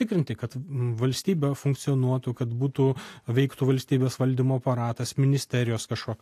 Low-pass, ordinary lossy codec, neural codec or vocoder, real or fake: 14.4 kHz; AAC, 64 kbps; none; real